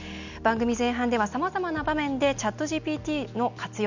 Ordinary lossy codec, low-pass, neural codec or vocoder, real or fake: none; 7.2 kHz; none; real